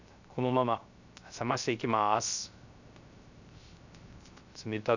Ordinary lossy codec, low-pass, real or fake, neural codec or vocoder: none; 7.2 kHz; fake; codec, 16 kHz, 0.3 kbps, FocalCodec